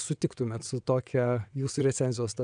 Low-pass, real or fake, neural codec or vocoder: 9.9 kHz; fake; vocoder, 22.05 kHz, 80 mel bands, WaveNeXt